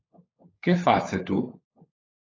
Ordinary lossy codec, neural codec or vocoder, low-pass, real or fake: AAC, 32 kbps; codec, 16 kHz, 16 kbps, FunCodec, trained on LibriTTS, 50 frames a second; 7.2 kHz; fake